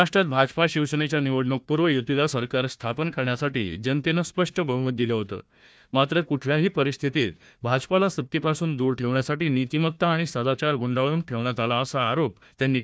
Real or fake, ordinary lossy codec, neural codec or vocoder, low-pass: fake; none; codec, 16 kHz, 1 kbps, FunCodec, trained on Chinese and English, 50 frames a second; none